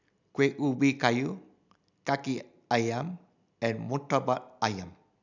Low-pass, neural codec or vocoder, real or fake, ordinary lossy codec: 7.2 kHz; none; real; none